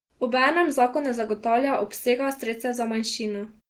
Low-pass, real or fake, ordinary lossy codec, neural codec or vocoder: 19.8 kHz; real; Opus, 16 kbps; none